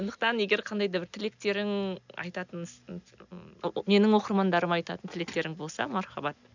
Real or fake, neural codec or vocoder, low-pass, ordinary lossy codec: real; none; 7.2 kHz; none